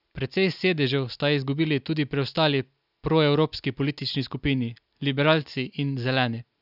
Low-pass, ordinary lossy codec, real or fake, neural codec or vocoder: 5.4 kHz; none; real; none